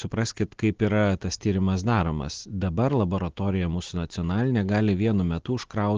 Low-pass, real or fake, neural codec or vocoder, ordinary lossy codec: 7.2 kHz; real; none; Opus, 16 kbps